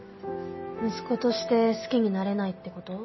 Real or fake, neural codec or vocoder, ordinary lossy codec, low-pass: real; none; MP3, 24 kbps; 7.2 kHz